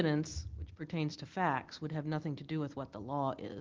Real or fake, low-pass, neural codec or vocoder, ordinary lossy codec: real; 7.2 kHz; none; Opus, 16 kbps